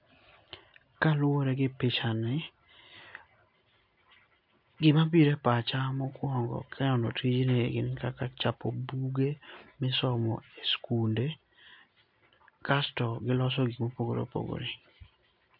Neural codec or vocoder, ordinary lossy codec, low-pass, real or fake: none; MP3, 48 kbps; 5.4 kHz; real